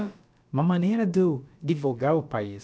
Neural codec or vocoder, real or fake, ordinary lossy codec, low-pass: codec, 16 kHz, about 1 kbps, DyCAST, with the encoder's durations; fake; none; none